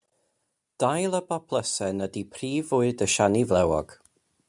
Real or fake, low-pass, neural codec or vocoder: real; 10.8 kHz; none